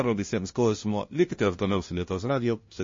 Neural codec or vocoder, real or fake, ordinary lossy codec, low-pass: codec, 16 kHz, 0.5 kbps, FunCodec, trained on LibriTTS, 25 frames a second; fake; MP3, 32 kbps; 7.2 kHz